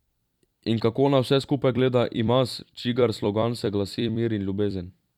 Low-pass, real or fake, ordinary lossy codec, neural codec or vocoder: 19.8 kHz; fake; none; vocoder, 44.1 kHz, 128 mel bands every 256 samples, BigVGAN v2